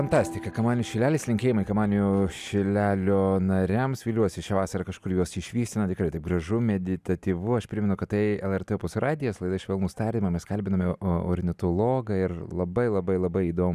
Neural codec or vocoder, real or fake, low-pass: none; real; 14.4 kHz